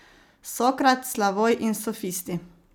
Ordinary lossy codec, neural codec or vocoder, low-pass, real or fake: none; none; none; real